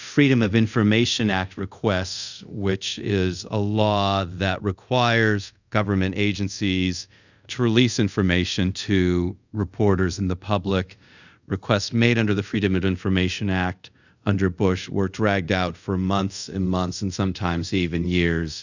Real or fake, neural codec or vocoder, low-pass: fake; codec, 24 kHz, 0.5 kbps, DualCodec; 7.2 kHz